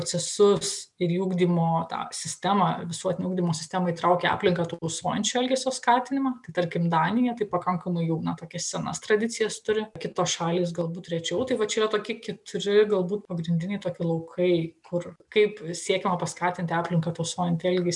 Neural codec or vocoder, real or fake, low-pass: none; real; 10.8 kHz